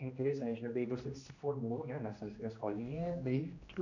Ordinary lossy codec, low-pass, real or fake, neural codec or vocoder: none; 7.2 kHz; fake; codec, 16 kHz, 1 kbps, X-Codec, HuBERT features, trained on general audio